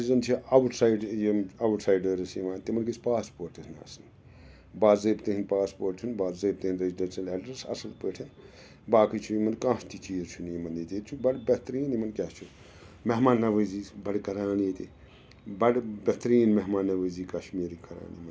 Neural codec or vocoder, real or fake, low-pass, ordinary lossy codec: none; real; none; none